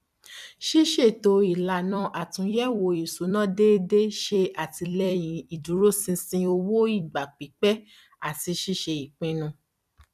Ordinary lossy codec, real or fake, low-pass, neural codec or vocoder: none; fake; 14.4 kHz; vocoder, 44.1 kHz, 128 mel bands every 256 samples, BigVGAN v2